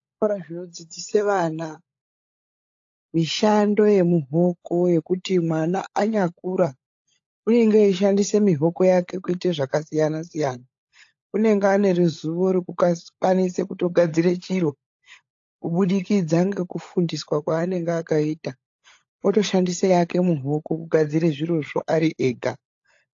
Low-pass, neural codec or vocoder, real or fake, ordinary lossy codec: 7.2 kHz; codec, 16 kHz, 16 kbps, FunCodec, trained on LibriTTS, 50 frames a second; fake; AAC, 48 kbps